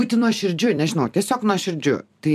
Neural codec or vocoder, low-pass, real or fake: vocoder, 44.1 kHz, 128 mel bands every 256 samples, BigVGAN v2; 14.4 kHz; fake